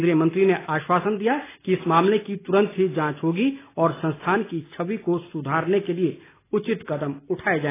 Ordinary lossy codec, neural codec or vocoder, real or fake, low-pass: AAC, 16 kbps; none; real; 3.6 kHz